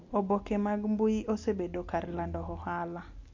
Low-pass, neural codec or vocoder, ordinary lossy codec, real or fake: 7.2 kHz; none; MP3, 48 kbps; real